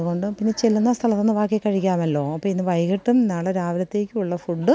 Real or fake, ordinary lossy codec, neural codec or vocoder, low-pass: real; none; none; none